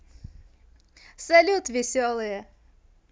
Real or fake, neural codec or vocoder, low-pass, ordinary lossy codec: real; none; none; none